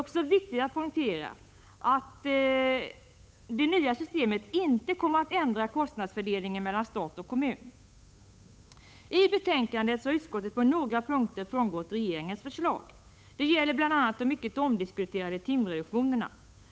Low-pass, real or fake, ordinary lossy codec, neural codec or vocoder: none; fake; none; codec, 16 kHz, 8 kbps, FunCodec, trained on Chinese and English, 25 frames a second